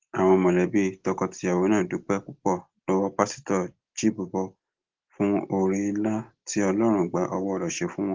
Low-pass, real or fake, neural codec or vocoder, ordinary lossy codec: 7.2 kHz; real; none; Opus, 16 kbps